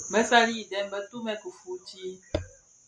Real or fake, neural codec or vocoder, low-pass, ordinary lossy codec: real; none; 9.9 kHz; MP3, 96 kbps